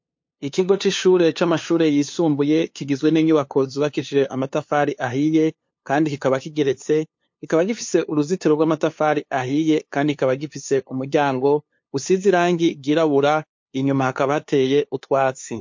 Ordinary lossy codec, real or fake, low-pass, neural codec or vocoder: MP3, 48 kbps; fake; 7.2 kHz; codec, 16 kHz, 2 kbps, FunCodec, trained on LibriTTS, 25 frames a second